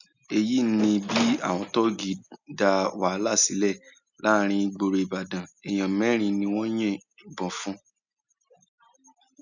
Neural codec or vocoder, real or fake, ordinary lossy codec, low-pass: none; real; none; 7.2 kHz